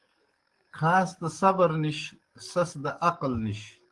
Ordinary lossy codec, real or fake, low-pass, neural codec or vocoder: Opus, 24 kbps; fake; 10.8 kHz; codec, 44.1 kHz, 7.8 kbps, DAC